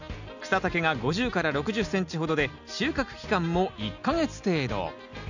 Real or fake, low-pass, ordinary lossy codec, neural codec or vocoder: real; 7.2 kHz; none; none